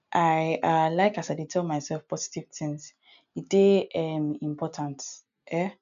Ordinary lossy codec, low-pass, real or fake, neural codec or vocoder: MP3, 96 kbps; 7.2 kHz; real; none